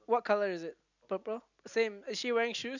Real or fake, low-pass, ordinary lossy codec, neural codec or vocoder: real; 7.2 kHz; none; none